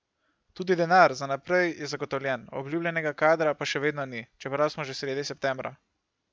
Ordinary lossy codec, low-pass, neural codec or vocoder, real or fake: none; none; none; real